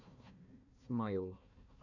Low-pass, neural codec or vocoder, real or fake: 7.2 kHz; codec, 16 kHz, 1 kbps, FunCodec, trained on Chinese and English, 50 frames a second; fake